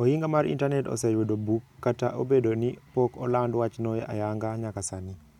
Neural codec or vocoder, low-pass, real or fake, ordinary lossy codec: none; 19.8 kHz; real; none